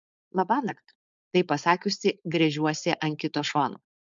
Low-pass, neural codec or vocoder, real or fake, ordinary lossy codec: 7.2 kHz; codec, 16 kHz, 4.8 kbps, FACodec; fake; MP3, 64 kbps